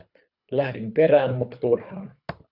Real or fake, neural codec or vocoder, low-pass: fake; codec, 24 kHz, 3 kbps, HILCodec; 5.4 kHz